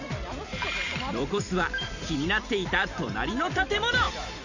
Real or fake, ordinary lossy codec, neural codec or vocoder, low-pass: fake; none; vocoder, 44.1 kHz, 128 mel bands every 256 samples, BigVGAN v2; 7.2 kHz